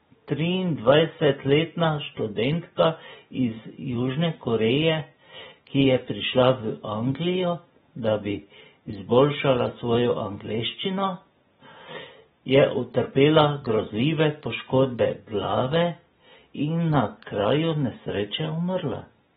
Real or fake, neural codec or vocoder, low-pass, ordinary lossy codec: real; none; 19.8 kHz; AAC, 16 kbps